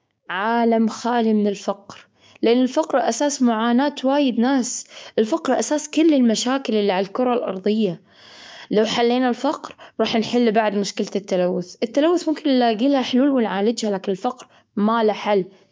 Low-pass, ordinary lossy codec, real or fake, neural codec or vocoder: none; none; fake; codec, 16 kHz, 6 kbps, DAC